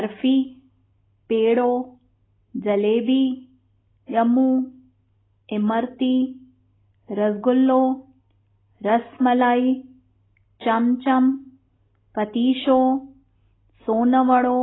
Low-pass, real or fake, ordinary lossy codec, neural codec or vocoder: 7.2 kHz; real; AAC, 16 kbps; none